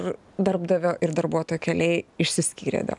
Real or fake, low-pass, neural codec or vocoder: real; 10.8 kHz; none